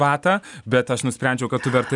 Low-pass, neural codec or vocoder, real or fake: 10.8 kHz; none; real